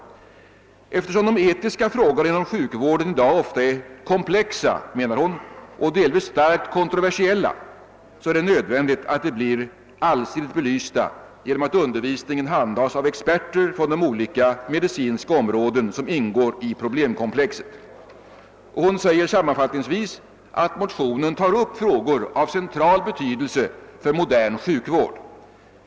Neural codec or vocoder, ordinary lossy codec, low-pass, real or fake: none; none; none; real